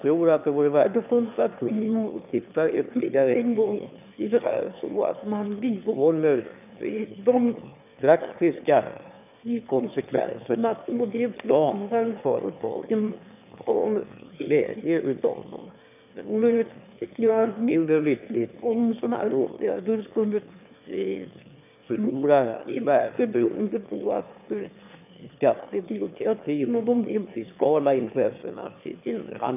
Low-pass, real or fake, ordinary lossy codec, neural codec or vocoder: 3.6 kHz; fake; none; autoencoder, 22.05 kHz, a latent of 192 numbers a frame, VITS, trained on one speaker